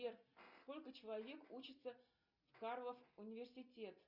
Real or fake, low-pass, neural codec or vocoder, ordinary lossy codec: real; 5.4 kHz; none; Opus, 64 kbps